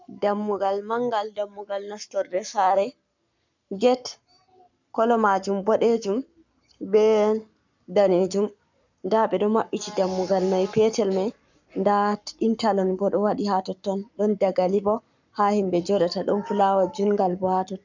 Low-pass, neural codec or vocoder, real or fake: 7.2 kHz; codec, 44.1 kHz, 7.8 kbps, Pupu-Codec; fake